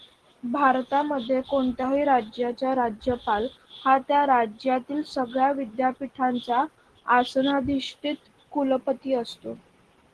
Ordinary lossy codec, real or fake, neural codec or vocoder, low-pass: Opus, 16 kbps; real; none; 10.8 kHz